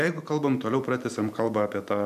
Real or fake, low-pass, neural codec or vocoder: fake; 14.4 kHz; vocoder, 44.1 kHz, 128 mel bands every 512 samples, BigVGAN v2